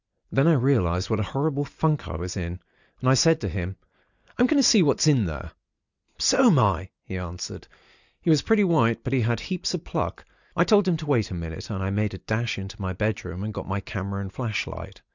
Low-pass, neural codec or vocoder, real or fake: 7.2 kHz; none; real